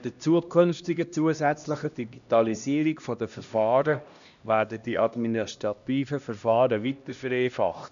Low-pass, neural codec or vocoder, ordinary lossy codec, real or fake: 7.2 kHz; codec, 16 kHz, 1 kbps, X-Codec, HuBERT features, trained on LibriSpeech; none; fake